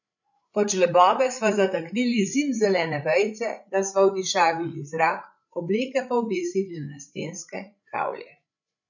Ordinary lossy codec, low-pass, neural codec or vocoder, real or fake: none; 7.2 kHz; codec, 16 kHz, 8 kbps, FreqCodec, larger model; fake